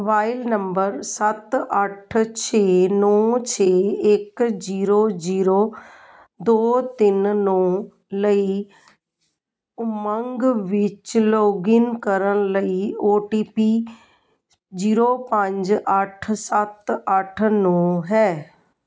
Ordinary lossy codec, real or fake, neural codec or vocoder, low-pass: none; real; none; none